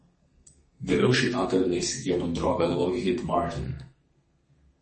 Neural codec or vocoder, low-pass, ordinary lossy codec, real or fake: codec, 32 kHz, 1.9 kbps, SNAC; 10.8 kHz; MP3, 32 kbps; fake